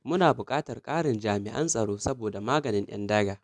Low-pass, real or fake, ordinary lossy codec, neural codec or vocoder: none; real; none; none